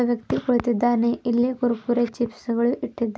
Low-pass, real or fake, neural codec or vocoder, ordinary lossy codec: none; real; none; none